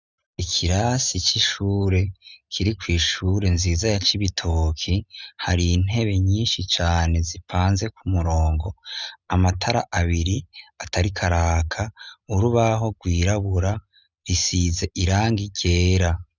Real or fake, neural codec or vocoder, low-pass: real; none; 7.2 kHz